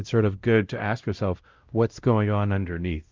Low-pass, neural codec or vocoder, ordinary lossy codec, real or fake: 7.2 kHz; codec, 16 kHz, 0.5 kbps, X-Codec, WavLM features, trained on Multilingual LibriSpeech; Opus, 24 kbps; fake